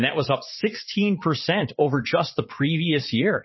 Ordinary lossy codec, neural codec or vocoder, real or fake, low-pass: MP3, 24 kbps; vocoder, 22.05 kHz, 80 mel bands, Vocos; fake; 7.2 kHz